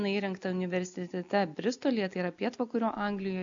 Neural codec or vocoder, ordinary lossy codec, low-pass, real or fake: none; AAC, 64 kbps; 7.2 kHz; real